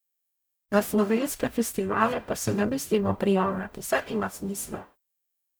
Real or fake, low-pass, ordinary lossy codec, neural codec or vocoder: fake; none; none; codec, 44.1 kHz, 0.9 kbps, DAC